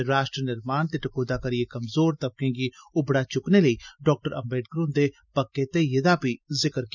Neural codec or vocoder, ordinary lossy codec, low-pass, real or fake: none; none; 7.2 kHz; real